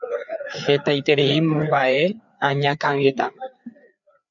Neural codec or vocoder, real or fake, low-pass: codec, 16 kHz, 4 kbps, FreqCodec, larger model; fake; 7.2 kHz